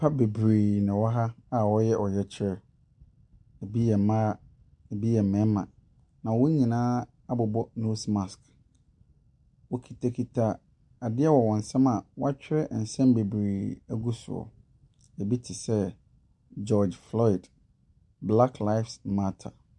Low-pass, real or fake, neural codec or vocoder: 10.8 kHz; real; none